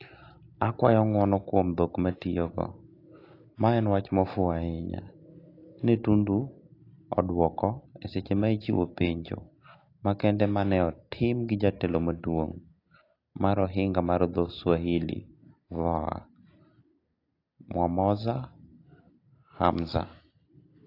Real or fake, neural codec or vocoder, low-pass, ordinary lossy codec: real; none; 5.4 kHz; AAC, 32 kbps